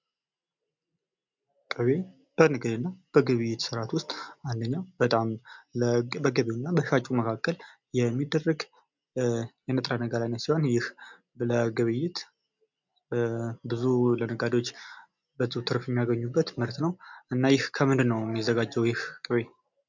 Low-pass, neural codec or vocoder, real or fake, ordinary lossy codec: 7.2 kHz; none; real; MP3, 64 kbps